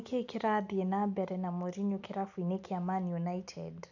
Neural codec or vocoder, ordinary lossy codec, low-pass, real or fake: none; none; 7.2 kHz; real